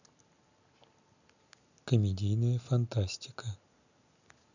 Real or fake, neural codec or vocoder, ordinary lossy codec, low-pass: real; none; none; 7.2 kHz